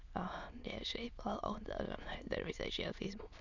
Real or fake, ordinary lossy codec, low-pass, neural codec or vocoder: fake; none; 7.2 kHz; autoencoder, 22.05 kHz, a latent of 192 numbers a frame, VITS, trained on many speakers